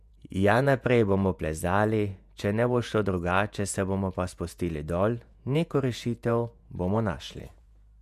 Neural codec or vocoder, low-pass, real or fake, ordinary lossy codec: vocoder, 48 kHz, 128 mel bands, Vocos; 14.4 kHz; fake; MP3, 96 kbps